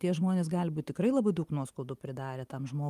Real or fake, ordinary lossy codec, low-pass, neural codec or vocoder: real; Opus, 32 kbps; 14.4 kHz; none